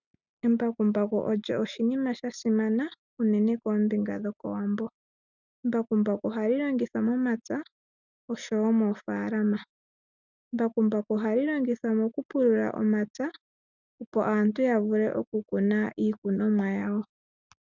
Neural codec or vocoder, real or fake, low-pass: none; real; 7.2 kHz